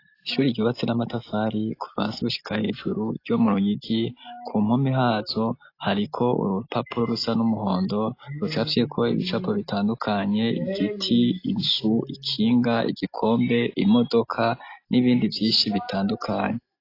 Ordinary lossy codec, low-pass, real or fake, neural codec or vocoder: AAC, 32 kbps; 5.4 kHz; real; none